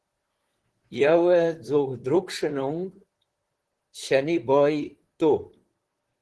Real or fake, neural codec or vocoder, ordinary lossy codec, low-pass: fake; vocoder, 44.1 kHz, 128 mel bands, Pupu-Vocoder; Opus, 16 kbps; 10.8 kHz